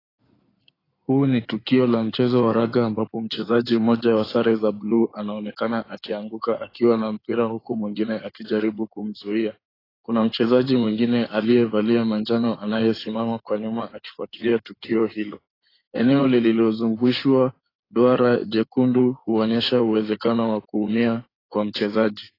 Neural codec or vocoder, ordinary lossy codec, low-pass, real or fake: codec, 16 kHz in and 24 kHz out, 2.2 kbps, FireRedTTS-2 codec; AAC, 24 kbps; 5.4 kHz; fake